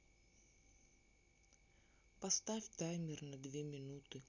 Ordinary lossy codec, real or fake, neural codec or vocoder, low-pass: none; real; none; 7.2 kHz